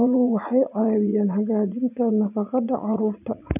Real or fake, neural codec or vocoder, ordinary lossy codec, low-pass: fake; vocoder, 44.1 kHz, 80 mel bands, Vocos; none; 3.6 kHz